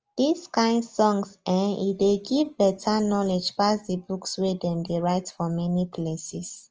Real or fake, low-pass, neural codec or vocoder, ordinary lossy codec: real; 7.2 kHz; none; Opus, 24 kbps